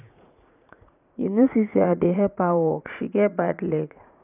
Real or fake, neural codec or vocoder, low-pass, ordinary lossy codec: fake; vocoder, 44.1 kHz, 80 mel bands, Vocos; 3.6 kHz; none